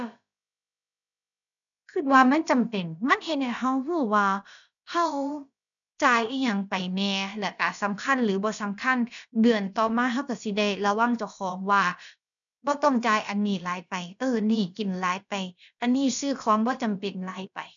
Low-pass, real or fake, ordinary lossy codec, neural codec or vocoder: 7.2 kHz; fake; none; codec, 16 kHz, about 1 kbps, DyCAST, with the encoder's durations